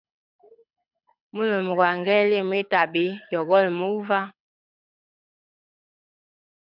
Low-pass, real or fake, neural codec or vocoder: 5.4 kHz; fake; codec, 24 kHz, 6 kbps, HILCodec